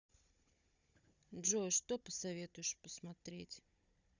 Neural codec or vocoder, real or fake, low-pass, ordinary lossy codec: codec, 16 kHz, 16 kbps, FunCodec, trained on Chinese and English, 50 frames a second; fake; 7.2 kHz; Opus, 64 kbps